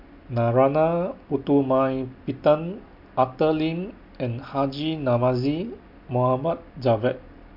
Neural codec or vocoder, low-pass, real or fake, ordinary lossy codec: none; 5.4 kHz; real; MP3, 48 kbps